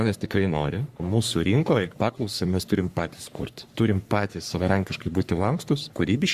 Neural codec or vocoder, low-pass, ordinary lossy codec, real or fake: codec, 44.1 kHz, 3.4 kbps, Pupu-Codec; 14.4 kHz; Opus, 64 kbps; fake